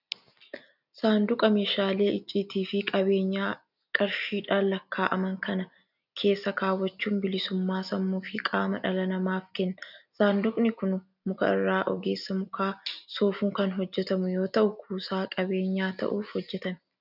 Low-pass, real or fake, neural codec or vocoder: 5.4 kHz; real; none